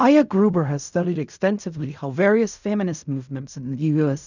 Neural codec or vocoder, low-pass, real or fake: codec, 16 kHz in and 24 kHz out, 0.4 kbps, LongCat-Audio-Codec, fine tuned four codebook decoder; 7.2 kHz; fake